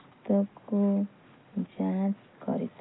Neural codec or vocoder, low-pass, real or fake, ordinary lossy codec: none; 7.2 kHz; real; AAC, 16 kbps